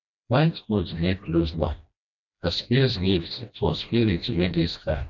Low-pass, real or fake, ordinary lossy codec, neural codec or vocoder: 7.2 kHz; fake; none; codec, 16 kHz, 1 kbps, FreqCodec, smaller model